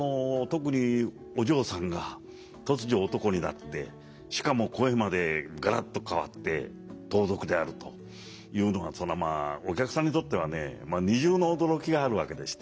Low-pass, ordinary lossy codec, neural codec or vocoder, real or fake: none; none; none; real